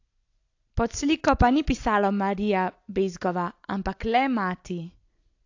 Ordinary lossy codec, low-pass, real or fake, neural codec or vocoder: AAC, 48 kbps; 7.2 kHz; real; none